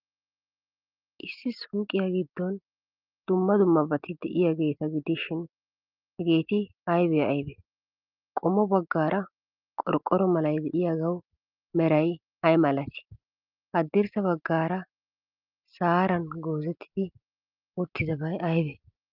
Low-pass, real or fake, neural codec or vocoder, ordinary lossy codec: 5.4 kHz; real; none; Opus, 24 kbps